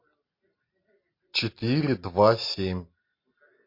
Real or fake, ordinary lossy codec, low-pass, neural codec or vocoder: real; MP3, 32 kbps; 5.4 kHz; none